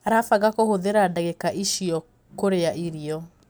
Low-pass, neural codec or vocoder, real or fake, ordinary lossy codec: none; none; real; none